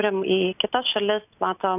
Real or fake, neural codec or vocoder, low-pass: real; none; 3.6 kHz